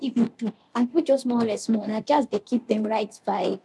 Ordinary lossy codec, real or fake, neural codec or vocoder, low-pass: none; fake; codec, 24 kHz, 0.9 kbps, DualCodec; none